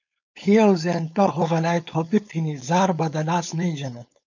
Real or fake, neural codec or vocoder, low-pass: fake; codec, 16 kHz, 4.8 kbps, FACodec; 7.2 kHz